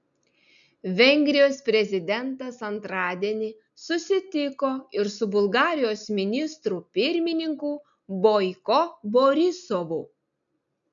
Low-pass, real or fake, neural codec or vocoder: 7.2 kHz; real; none